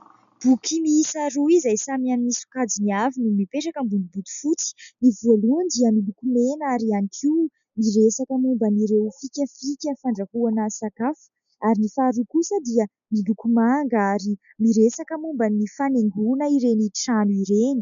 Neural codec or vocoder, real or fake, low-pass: none; real; 7.2 kHz